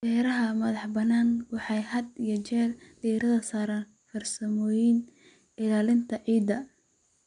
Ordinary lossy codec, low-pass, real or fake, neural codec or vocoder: none; 9.9 kHz; real; none